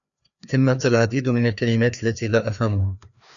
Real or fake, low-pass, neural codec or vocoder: fake; 7.2 kHz; codec, 16 kHz, 2 kbps, FreqCodec, larger model